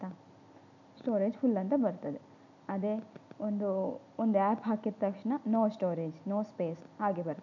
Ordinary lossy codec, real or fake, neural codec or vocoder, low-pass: none; real; none; 7.2 kHz